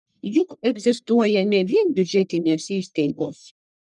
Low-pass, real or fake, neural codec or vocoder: 10.8 kHz; fake; codec, 44.1 kHz, 1.7 kbps, Pupu-Codec